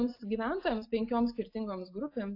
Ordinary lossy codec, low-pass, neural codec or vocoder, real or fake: AAC, 32 kbps; 5.4 kHz; none; real